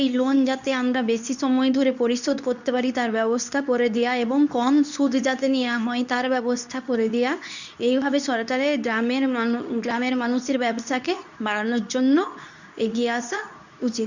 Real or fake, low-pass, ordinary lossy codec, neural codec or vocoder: fake; 7.2 kHz; none; codec, 24 kHz, 0.9 kbps, WavTokenizer, medium speech release version 2